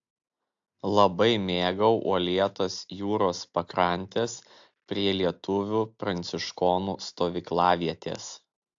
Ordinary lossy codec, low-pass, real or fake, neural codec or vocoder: AAC, 64 kbps; 7.2 kHz; real; none